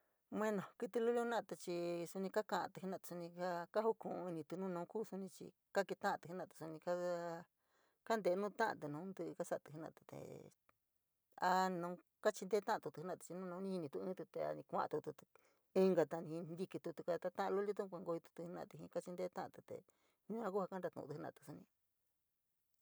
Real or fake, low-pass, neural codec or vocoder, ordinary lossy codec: real; none; none; none